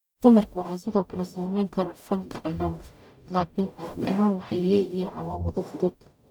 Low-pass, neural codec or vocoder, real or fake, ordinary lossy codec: 19.8 kHz; codec, 44.1 kHz, 0.9 kbps, DAC; fake; none